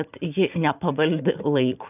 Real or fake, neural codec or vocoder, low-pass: fake; vocoder, 44.1 kHz, 128 mel bands every 512 samples, BigVGAN v2; 3.6 kHz